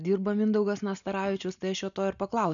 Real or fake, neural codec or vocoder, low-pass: real; none; 7.2 kHz